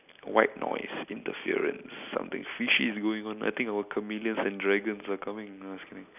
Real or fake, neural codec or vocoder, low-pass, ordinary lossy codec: real; none; 3.6 kHz; none